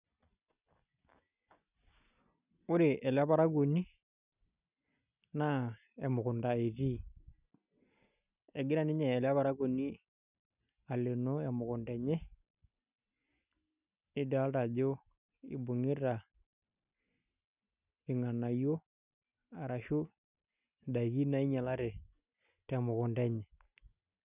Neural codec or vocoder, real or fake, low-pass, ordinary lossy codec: none; real; 3.6 kHz; none